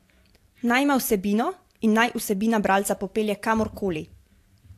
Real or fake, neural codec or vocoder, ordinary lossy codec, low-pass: fake; vocoder, 44.1 kHz, 128 mel bands every 512 samples, BigVGAN v2; AAC, 64 kbps; 14.4 kHz